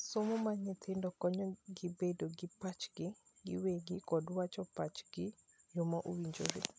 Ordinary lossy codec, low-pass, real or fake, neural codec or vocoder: none; none; real; none